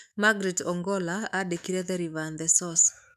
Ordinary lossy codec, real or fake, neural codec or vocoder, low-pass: none; fake; autoencoder, 48 kHz, 128 numbers a frame, DAC-VAE, trained on Japanese speech; 14.4 kHz